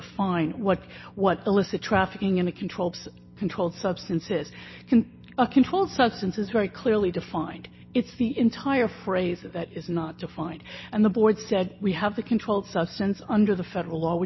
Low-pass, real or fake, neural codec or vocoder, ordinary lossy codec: 7.2 kHz; real; none; MP3, 24 kbps